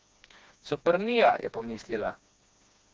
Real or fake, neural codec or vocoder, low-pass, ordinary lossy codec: fake; codec, 16 kHz, 2 kbps, FreqCodec, smaller model; none; none